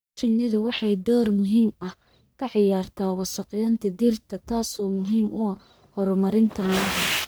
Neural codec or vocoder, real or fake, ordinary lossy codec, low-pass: codec, 44.1 kHz, 1.7 kbps, Pupu-Codec; fake; none; none